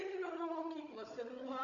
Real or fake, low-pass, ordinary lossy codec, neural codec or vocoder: fake; 7.2 kHz; Opus, 64 kbps; codec, 16 kHz, 16 kbps, FunCodec, trained on Chinese and English, 50 frames a second